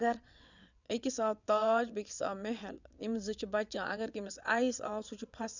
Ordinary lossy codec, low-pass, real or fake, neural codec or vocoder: none; 7.2 kHz; fake; vocoder, 22.05 kHz, 80 mel bands, WaveNeXt